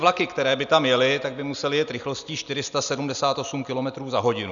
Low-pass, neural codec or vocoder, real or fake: 7.2 kHz; none; real